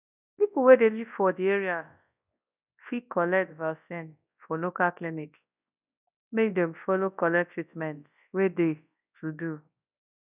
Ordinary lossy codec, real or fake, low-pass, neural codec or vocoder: none; fake; 3.6 kHz; codec, 24 kHz, 0.9 kbps, WavTokenizer, large speech release